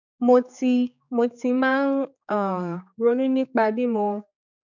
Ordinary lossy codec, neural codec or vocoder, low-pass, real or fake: none; codec, 16 kHz, 4 kbps, X-Codec, HuBERT features, trained on general audio; 7.2 kHz; fake